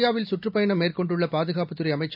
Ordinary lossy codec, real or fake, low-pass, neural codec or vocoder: AAC, 48 kbps; real; 5.4 kHz; none